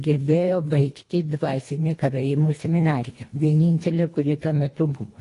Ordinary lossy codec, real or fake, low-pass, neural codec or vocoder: AAC, 48 kbps; fake; 10.8 kHz; codec, 24 kHz, 1.5 kbps, HILCodec